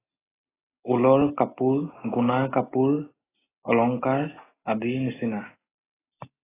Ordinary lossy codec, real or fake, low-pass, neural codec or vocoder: AAC, 16 kbps; real; 3.6 kHz; none